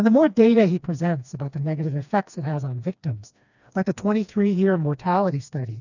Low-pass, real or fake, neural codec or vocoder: 7.2 kHz; fake; codec, 16 kHz, 2 kbps, FreqCodec, smaller model